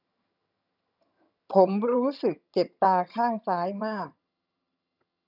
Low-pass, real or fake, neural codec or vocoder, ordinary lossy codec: 5.4 kHz; fake; vocoder, 22.05 kHz, 80 mel bands, HiFi-GAN; none